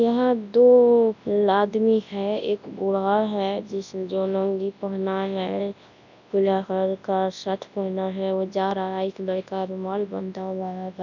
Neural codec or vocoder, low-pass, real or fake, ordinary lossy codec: codec, 24 kHz, 0.9 kbps, WavTokenizer, large speech release; 7.2 kHz; fake; none